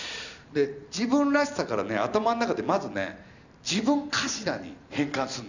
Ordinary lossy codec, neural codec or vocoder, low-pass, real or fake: none; none; 7.2 kHz; real